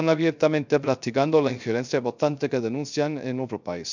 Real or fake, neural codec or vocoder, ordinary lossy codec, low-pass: fake; codec, 16 kHz, 0.3 kbps, FocalCodec; none; 7.2 kHz